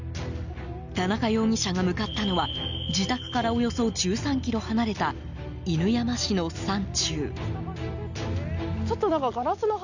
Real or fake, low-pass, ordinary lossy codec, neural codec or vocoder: real; 7.2 kHz; none; none